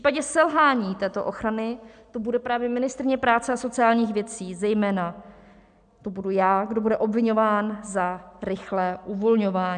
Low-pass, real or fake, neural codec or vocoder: 9.9 kHz; real; none